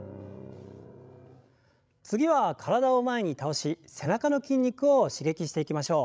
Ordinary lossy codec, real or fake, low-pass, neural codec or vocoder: none; fake; none; codec, 16 kHz, 16 kbps, FreqCodec, larger model